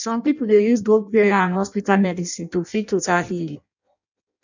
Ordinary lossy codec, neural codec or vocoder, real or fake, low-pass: none; codec, 16 kHz in and 24 kHz out, 0.6 kbps, FireRedTTS-2 codec; fake; 7.2 kHz